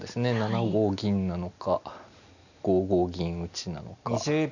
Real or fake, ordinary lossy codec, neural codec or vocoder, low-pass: real; none; none; 7.2 kHz